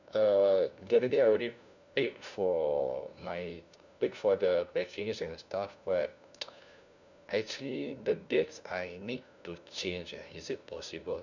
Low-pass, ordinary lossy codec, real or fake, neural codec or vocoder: 7.2 kHz; none; fake; codec, 16 kHz, 1 kbps, FunCodec, trained on LibriTTS, 50 frames a second